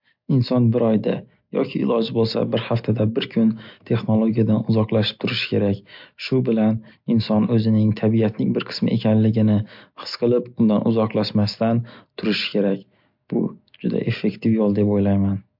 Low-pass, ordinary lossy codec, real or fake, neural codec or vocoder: 5.4 kHz; MP3, 48 kbps; real; none